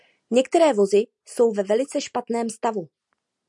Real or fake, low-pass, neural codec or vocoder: real; 10.8 kHz; none